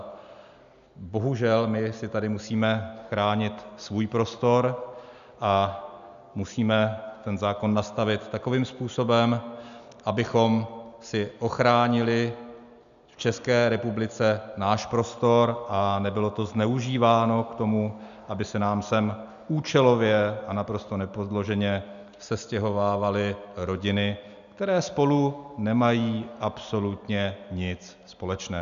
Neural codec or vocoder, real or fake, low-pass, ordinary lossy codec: none; real; 7.2 kHz; MP3, 96 kbps